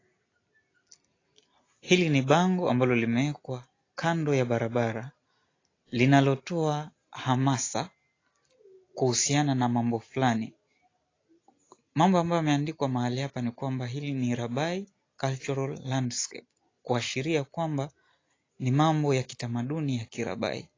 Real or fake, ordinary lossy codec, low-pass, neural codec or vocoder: real; AAC, 32 kbps; 7.2 kHz; none